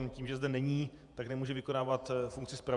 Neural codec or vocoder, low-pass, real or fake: vocoder, 48 kHz, 128 mel bands, Vocos; 10.8 kHz; fake